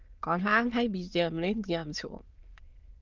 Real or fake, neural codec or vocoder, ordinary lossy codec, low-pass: fake; autoencoder, 22.05 kHz, a latent of 192 numbers a frame, VITS, trained on many speakers; Opus, 24 kbps; 7.2 kHz